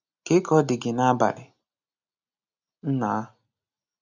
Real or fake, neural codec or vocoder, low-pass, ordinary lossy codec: real; none; 7.2 kHz; none